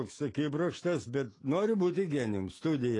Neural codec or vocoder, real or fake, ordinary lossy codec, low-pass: autoencoder, 48 kHz, 128 numbers a frame, DAC-VAE, trained on Japanese speech; fake; AAC, 32 kbps; 10.8 kHz